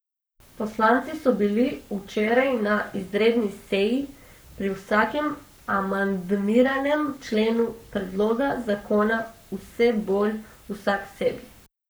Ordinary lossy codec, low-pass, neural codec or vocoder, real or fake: none; none; codec, 44.1 kHz, 7.8 kbps, Pupu-Codec; fake